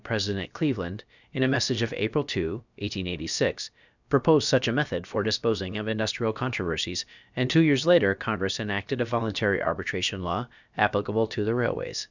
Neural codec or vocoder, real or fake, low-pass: codec, 16 kHz, about 1 kbps, DyCAST, with the encoder's durations; fake; 7.2 kHz